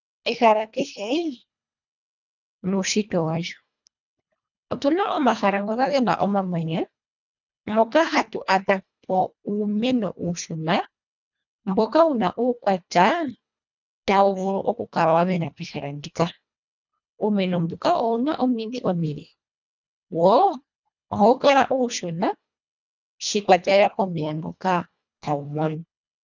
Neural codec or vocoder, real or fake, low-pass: codec, 24 kHz, 1.5 kbps, HILCodec; fake; 7.2 kHz